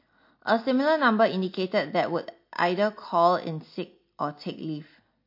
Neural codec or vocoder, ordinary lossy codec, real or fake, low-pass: none; MP3, 32 kbps; real; 5.4 kHz